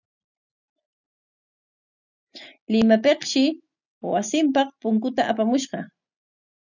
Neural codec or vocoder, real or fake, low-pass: none; real; 7.2 kHz